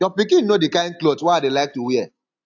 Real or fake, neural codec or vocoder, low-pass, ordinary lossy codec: real; none; 7.2 kHz; AAC, 48 kbps